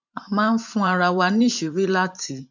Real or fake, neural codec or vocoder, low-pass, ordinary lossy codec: fake; vocoder, 44.1 kHz, 128 mel bands every 512 samples, BigVGAN v2; 7.2 kHz; none